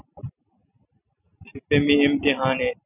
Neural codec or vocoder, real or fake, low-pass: none; real; 3.6 kHz